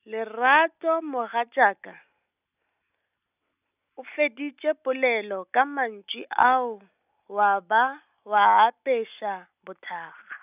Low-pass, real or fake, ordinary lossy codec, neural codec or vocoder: 3.6 kHz; real; none; none